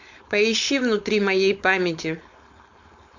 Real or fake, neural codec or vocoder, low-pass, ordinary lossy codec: fake; codec, 16 kHz, 4.8 kbps, FACodec; 7.2 kHz; MP3, 64 kbps